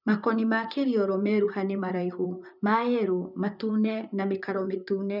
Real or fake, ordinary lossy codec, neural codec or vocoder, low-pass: fake; none; vocoder, 44.1 kHz, 128 mel bands, Pupu-Vocoder; 5.4 kHz